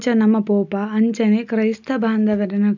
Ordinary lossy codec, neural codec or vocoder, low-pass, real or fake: none; none; 7.2 kHz; real